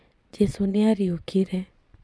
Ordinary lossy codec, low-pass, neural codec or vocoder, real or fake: none; none; vocoder, 22.05 kHz, 80 mel bands, Vocos; fake